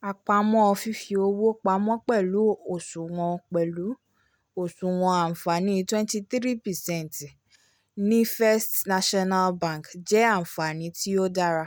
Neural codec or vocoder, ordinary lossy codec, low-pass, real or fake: none; none; none; real